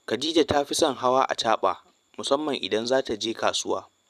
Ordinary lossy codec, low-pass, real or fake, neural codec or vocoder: none; 14.4 kHz; fake; vocoder, 44.1 kHz, 128 mel bands every 512 samples, BigVGAN v2